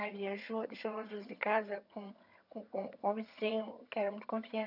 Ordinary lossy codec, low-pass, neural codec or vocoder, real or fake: none; 5.4 kHz; vocoder, 22.05 kHz, 80 mel bands, HiFi-GAN; fake